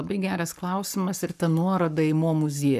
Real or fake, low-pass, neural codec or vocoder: fake; 14.4 kHz; codec, 44.1 kHz, 7.8 kbps, Pupu-Codec